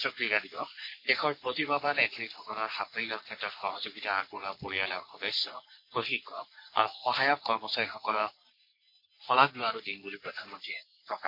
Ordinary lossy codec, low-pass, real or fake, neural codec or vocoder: MP3, 32 kbps; 5.4 kHz; fake; codec, 44.1 kHz, 3.4 kbps, Pupu-Codec